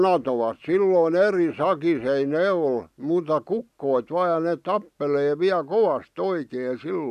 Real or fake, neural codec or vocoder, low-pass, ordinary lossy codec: real; none; 14.4 kHz; none